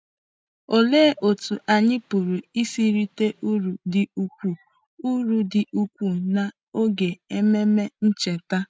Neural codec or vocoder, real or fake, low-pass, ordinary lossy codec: none; real; none; none